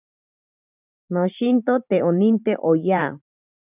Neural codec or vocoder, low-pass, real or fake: vocoder, 44.1 kHz, 128 mel bands every 512 samples, BigVGAN v2; 3.6 kHz; fake